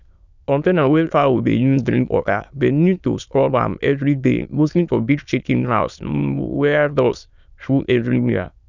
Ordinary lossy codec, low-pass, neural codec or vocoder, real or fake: none; 7.2 kHz; autoencoder, 22.05 kHz, a latent of 192 numbers a frame, VITS, trained on many speakers; fake